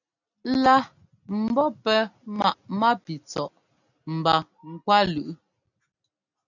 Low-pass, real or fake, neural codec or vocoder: 7.2 kHz; real; none